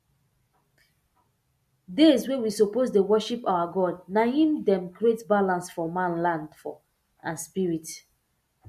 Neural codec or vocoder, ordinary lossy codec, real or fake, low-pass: none; MP3, 64 kbps; real; 14.4 kHz